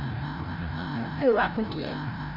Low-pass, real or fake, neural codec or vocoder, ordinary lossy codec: 5.4 kHz; fake; codec, 16 kHz, 0.5 kbps, FreqCodec, larger model; none